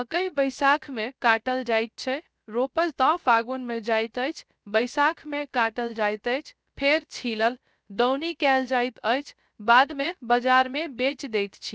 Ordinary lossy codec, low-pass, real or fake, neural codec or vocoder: none; none; fake; codec, 16 kHz, 0.3 kbps, FocalCodec